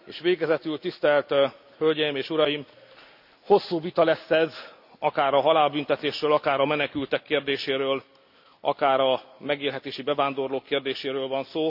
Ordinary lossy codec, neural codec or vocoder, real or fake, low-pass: AAC, 48 kbps; none; real; 5.4 kHz